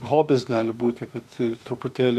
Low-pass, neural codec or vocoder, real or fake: 14.4 kHz; autoencoder, 48 kHz, 32 numbers a frame, DAC-VAE, trained on Japanese speech; fake